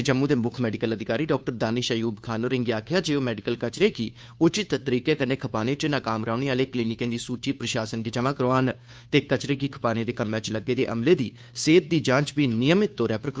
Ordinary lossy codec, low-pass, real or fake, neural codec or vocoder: none; none; fake; codec, 16 kHz, 2 kbps, FunCodec, trained on Chinese and English, 25 frames a second